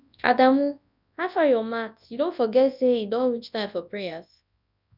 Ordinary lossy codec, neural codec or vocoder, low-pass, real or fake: none; codec, 24 kHz, 0.9 kbps, WavTokenizer, large speech release; 5.4 kHz; fake